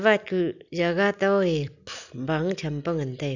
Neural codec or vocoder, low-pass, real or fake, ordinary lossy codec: none; 7.2 kHz; real; none